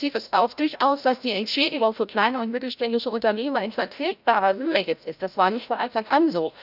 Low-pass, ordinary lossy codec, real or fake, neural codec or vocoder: 5.4 kHz; none; fake; codec, 16 kHz, 0.5 kbps, FreqCodec, larger model